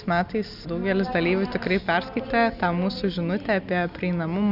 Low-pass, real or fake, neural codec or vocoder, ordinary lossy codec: 5.4 kHz; real; none; Opus, 64 kbps